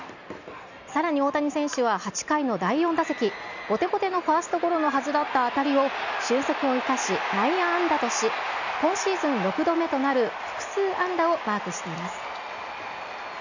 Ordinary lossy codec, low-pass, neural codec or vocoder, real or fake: none; 7.2 kHz; none; real